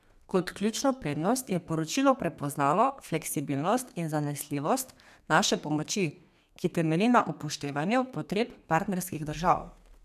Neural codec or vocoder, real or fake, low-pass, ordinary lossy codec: codec, 32 kHz, 1.9 kbps, SNAC; fake; 14.4 kHz; none